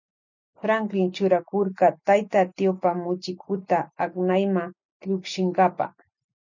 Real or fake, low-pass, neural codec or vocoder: real; 7.2 kHz; none